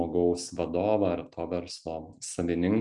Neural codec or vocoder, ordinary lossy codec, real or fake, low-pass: none; MP3, 96 kbps; real; 10.8 kHz